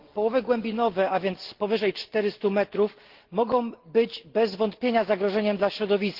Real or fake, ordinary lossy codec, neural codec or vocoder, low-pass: real; Opus, 16 kbps; none; 5.4 kHz